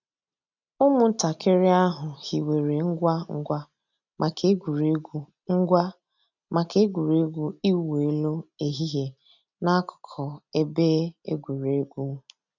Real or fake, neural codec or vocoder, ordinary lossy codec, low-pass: real; none; none; 7.2 kHz